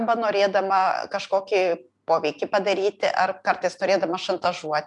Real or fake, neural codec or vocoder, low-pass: real; none; 10.8 kHz